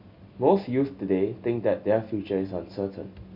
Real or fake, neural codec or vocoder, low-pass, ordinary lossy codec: real; none; 5.4 kHz; none